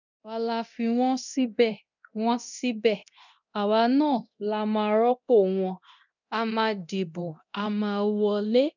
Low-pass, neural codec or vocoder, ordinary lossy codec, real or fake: 7.2 kHz; codec, 24 kHz, 0.9 kbps, DualCodec; none; fake